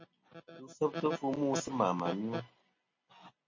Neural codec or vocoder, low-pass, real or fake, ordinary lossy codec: none; 7.2 kHz; real; MP3, 32 kbps